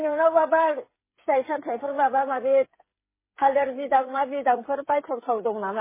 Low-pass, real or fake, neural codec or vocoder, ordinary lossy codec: 3.6 kHz; fake; codec, 16 kHz, 8 kbps, FreqCodec, smaller model; MP3, 16 kbps